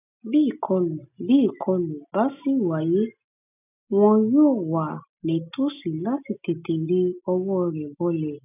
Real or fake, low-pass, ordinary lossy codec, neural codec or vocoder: real; 3.6 kHz; none; none